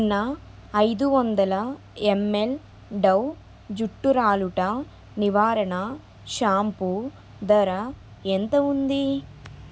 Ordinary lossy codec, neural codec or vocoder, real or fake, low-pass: none; none; real; none